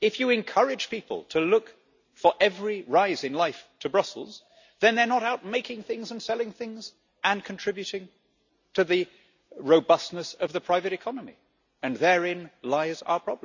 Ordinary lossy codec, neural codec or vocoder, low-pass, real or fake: none; none; 7.2 kHz; real